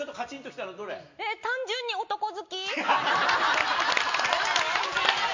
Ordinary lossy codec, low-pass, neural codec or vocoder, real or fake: MP3, 64 kbps; 7.2 kHz; none; real